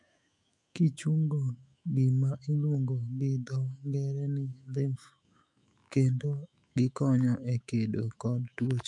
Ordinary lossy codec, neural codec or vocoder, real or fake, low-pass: MP3, 64 kbps; autoencoder, 48 kHz, 128 numbers a frame, DAC-VAE, trained on Japanese speech; fake; 10.8 kHz